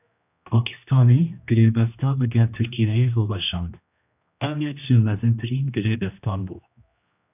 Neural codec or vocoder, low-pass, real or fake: codec, 16 kHz, 1 kbps, X-Codec, HuBERT features, trained on general audio; 3.6 kHz; fake